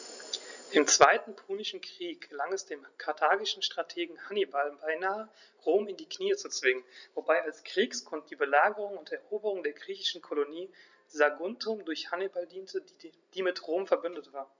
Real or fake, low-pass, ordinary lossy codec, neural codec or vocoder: real; 7.2 kHz; none; none